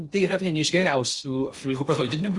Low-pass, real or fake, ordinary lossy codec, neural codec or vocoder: 10.8 kHz; fake; Opus, 64 kbps; codec, 16 kHz in and 24 kHz out, 0.6 kbps, FocalCodec, streaming, 2048 codes